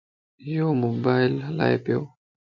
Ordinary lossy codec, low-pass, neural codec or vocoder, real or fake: MP3, 48 kbps; 7.2 kHz; none; real